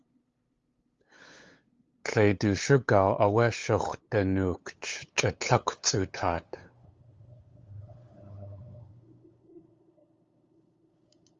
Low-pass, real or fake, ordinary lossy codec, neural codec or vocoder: 7.2 kHz; fake; Opus, 32 kbps; codec, 16 kHz, 8 kbps, FunCodec, trained on LibriTTS, 25 frames a second